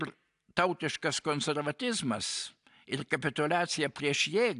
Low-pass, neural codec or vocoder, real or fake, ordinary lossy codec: 10.8 kHz; none; real; AAC, 96 kbps